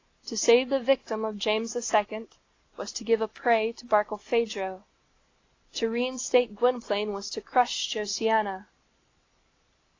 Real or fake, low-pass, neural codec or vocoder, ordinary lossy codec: real; 7.2 kHz; none; AAC, 32 kbps